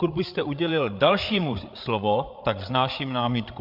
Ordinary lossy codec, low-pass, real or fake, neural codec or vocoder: MP3, 48 kbps; 5.4 kHz; fake; codec, 16 kHz, 8 kbps, FreqCodec, larger model